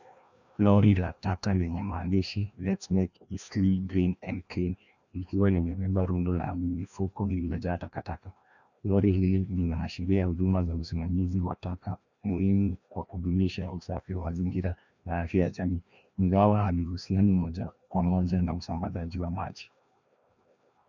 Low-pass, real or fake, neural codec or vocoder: 7.2 kHz; fake; codec, 16 kHz, 1 kbps, FreqCodec, larger model